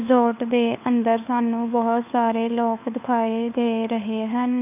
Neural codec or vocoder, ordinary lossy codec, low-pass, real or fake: codec, 16 kHz, 4 kbps, FunCodec, trained on LibriTTS, 50 frames a second; none; 3.6 kHz; fake